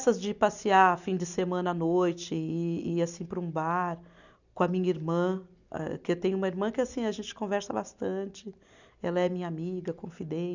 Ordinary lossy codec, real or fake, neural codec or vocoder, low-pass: none; real; none; 7.2 kHz